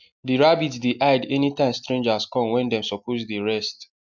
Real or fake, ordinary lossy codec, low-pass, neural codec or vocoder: real; MP3, 64 kbps; 7.2 kHz; none